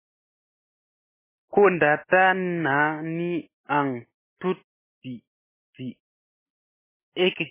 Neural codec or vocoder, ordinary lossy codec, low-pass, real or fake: none; MP3, 16 kbps; 3.6 kHz; real